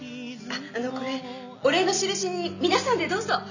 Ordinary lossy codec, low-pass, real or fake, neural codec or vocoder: none; 7.2 kHz; real; none